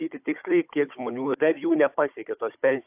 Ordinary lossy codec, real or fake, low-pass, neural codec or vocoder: AAC, 32 kbps; fake; 3.6 kHz; codec, 16 kHz, 16 kbps, FunCodec, trained on Chinese and English, 50 frames a second